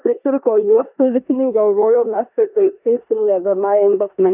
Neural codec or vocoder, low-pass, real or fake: codec, 16 kHz in and 24 kHz out, 0.9 kbps, LongCat-Audio-Codec, four codebook decoder; 3.6 kHz; fake